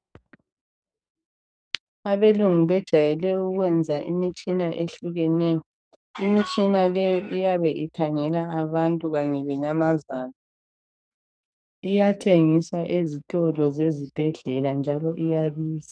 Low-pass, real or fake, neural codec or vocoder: 9.9 kHz; fake; codec, 44.1 kHz, 2.6 kbps, SNAC